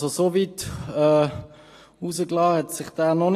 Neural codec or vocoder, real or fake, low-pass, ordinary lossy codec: none; real; 14.4 kHz; AAC, 48 kbps